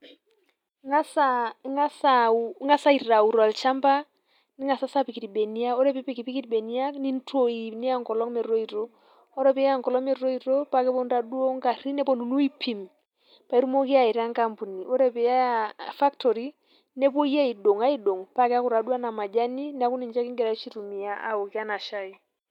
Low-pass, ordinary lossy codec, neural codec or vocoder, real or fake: 19.8 kHz; none; none; real